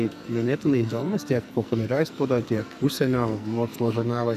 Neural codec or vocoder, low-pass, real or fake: codec, 44.1 kHz, 2.6 kbps, SNAC; 14.4 kHz; fake